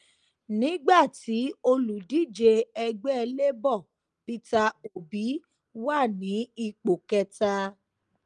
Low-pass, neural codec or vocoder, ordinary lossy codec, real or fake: 9.9 kHz; none; Opus, 32 kbps; real